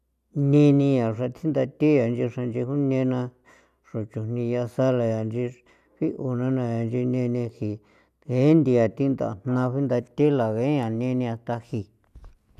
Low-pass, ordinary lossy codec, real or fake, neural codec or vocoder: 14.4 kHz; none; real; none